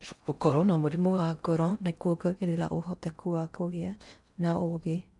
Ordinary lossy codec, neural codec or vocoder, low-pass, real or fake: none; codec, 16 kHz in and 24 kHz out, 0.6 kbps, FocalCodec, streaming, 4096 codes; 10.8 kHz; fake